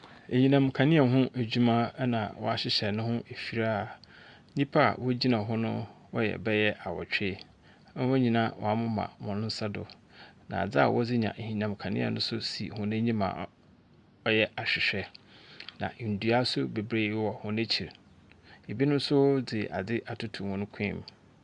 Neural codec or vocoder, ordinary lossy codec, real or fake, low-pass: none; Opus, 64 kbps; real; 10.8 kHz